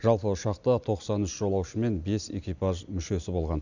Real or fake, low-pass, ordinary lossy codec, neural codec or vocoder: real; 7.2 kHz; none; none